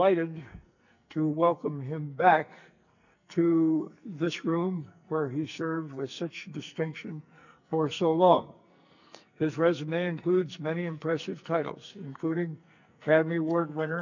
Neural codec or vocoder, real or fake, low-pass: codec, 44.1 kHz, 2.6 kbps, SNAC; fake; 7.2 kHz